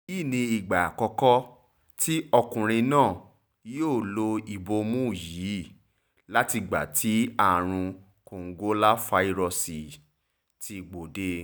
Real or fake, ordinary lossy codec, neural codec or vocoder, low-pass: real; none; none; none